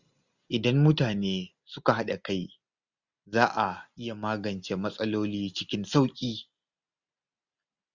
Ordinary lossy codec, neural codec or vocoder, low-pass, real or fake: Opus, 64 kbps; none; 7.2 kHz; real